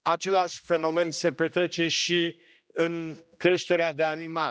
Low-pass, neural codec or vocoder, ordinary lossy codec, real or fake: none; codec, 16 kHz, 1 kbps, X-Codec, HuBERT features, trained on general audio; none; fake